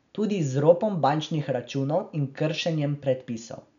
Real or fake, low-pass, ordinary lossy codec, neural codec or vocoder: real; 7.2 kHz; none; none